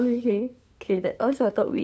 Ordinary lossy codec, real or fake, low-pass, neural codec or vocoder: none; fake; none; codec, 16 kHz, 8 kbps, FunCodec, trained on LibriTTS, 25 frames a second